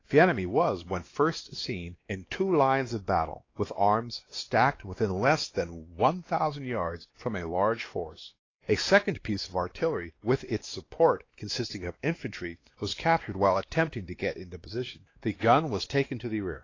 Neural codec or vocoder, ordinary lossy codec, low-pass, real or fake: codec, 16 kHz, 2 kbps, X-Codec, WavLM features, trained on Multilingual LibriSpeech; AAC, 32 kbps; 7.2 kHz; fake